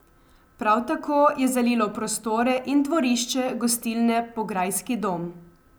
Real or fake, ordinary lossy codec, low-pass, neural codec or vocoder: real; none; none; none